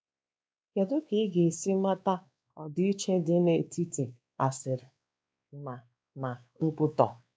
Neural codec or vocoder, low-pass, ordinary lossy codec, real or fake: codec, 16 kHz, 2 kbps, X-Codec, WavLM features, trained on Multilingual LibriSpeech; none; none; fake